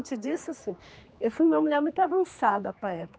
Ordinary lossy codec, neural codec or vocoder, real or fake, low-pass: none; codec, 16 kHz, 2 kbps, X-Codec, HuBERT features, trained on general audio; fake; none